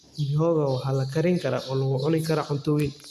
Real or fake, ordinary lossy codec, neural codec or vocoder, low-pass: real; none; none; 14.4 kHz